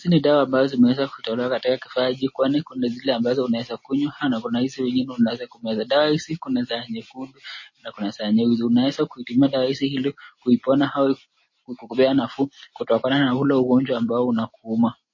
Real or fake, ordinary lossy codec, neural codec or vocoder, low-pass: real; MP3, 32 kbps; none; 7.2 kHz